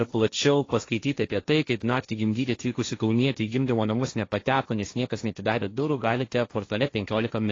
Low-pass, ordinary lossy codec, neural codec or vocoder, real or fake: 7.2 kHz; AAC, 32 kbps; codec, 16 kHz, 1.1 kbps, Voila-Tokenizer; fake